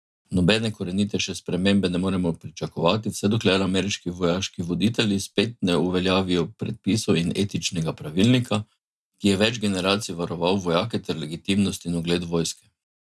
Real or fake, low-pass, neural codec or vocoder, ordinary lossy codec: real; none; none; none